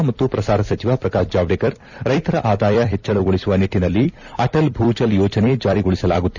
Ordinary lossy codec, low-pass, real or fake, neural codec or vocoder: none; 7.2 kHz; fake; vocoder, 44.1 kHz, 128 mel bands every 256 samples, BigVGAN v2